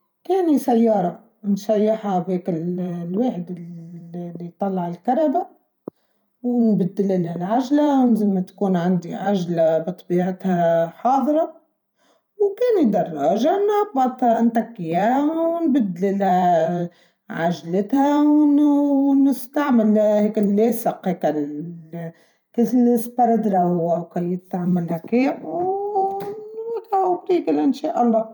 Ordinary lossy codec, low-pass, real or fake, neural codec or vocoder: none; 19.8 kHz; fake; vocoder, 44.1 kHz, 128 mel bands every 512 samples, BigVGAN v2